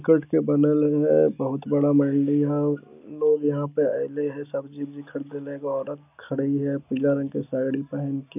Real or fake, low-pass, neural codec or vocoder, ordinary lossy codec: real; 3.6 kHz; none; none